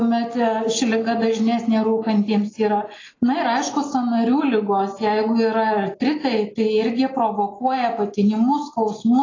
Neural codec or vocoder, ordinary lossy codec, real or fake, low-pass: none; AAC, 32 kbps; real; 7.2 kHz